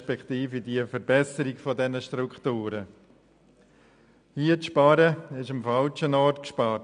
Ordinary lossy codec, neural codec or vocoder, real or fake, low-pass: none; none; real; 9.9 kHz